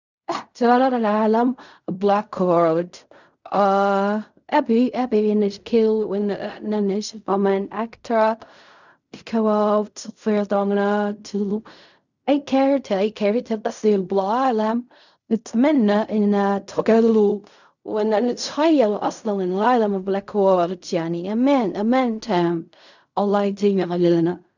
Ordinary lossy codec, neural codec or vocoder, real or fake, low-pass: none; codec, 16 kHz in and 24 kHz out, 0.4 kbps, LongCat-Audio-Codec, fine tuned four codebook decoder; fake; 7.2 kHz